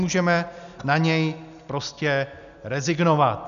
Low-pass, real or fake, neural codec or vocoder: 7.2 kHz; real; none